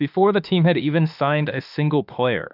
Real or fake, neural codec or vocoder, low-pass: fake; autoencoder, 48 kHz, 32 numbers a frame, DAC-VAE, trained on Japanese speech; 5.4 kHz